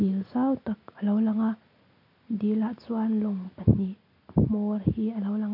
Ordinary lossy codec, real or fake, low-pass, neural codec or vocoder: AAC, 48 kbps; real; 5.4 kHz; none